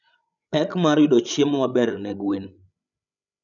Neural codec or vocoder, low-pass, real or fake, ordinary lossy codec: codec, 16 kHz, 16 kbps, FreqCodec, larger model; 7.2 kHz; fake; none